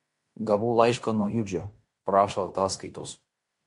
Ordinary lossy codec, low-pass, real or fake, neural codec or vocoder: MP3, 48 kbps; 10.8 kHz; fake; codec, 16 kHz in and 24 kHz out, 0.9 kbps, LongCat-Audio-Codec, fine tuned four codebook decoder